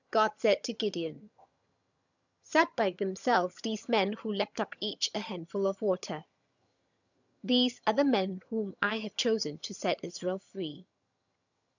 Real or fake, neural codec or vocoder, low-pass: fake; vocoder, 22.05 kHz, 80 mel bands, HiFi-GAN; 7.2 kHz